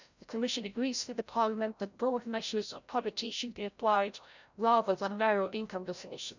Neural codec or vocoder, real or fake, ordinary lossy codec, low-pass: codec, 16 kHz, 0.5 kbps, FreqCodec, larger model; fake; none; 7.2 kHz